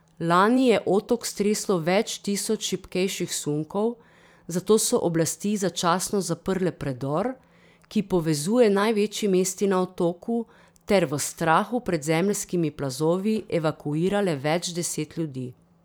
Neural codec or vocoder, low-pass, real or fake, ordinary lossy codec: vocoder, 44.1 kHz, 128 mel bands every 512 samples, BigVGAN v2; none; fake; none